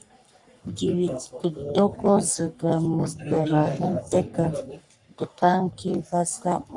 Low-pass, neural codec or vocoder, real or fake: 10.8 kHz; codec, 44.1 kHz, 3.4 kbps, Pupu-Codec; fake